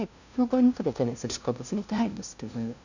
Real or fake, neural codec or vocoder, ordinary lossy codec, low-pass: fake; codec, 16 kHz, 0.5 kbps, FunCodec, trained on LibriTTS, 25 frames a second; none; 7.2 kHz